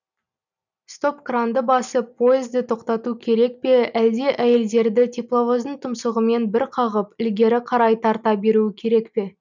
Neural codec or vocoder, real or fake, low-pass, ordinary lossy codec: none; real; 7.2 kHz; none